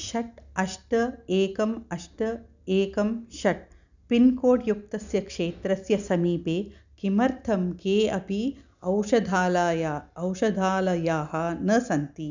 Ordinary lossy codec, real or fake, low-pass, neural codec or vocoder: none; real; 7.2 kHz; none